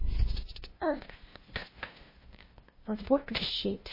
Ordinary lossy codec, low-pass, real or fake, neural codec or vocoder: MP3, 24 kbps; 5.4 kHz; fake; codec, 16 kHz, 0.5 kbps, FunCodec, trained on LibriTTS, 25 frames a second